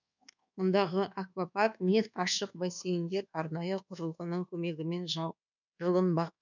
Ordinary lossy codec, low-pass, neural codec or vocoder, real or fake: none; 7.2 kHz; codec, 24 kHz, 1.2 kbps, DualCodec; fake